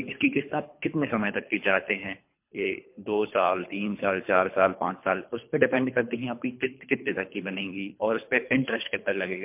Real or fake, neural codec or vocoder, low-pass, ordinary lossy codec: fake; codec, 24 kHz, 3 kbps, HILCodec; 3.6 kHz; MP3, 32 kbps